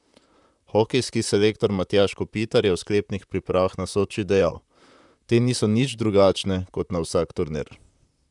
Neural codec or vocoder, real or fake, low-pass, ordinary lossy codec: vocoder, 44.1 kHz, 128 mel bands, Pupu-Vocoder; fake; 10.8 kHz; none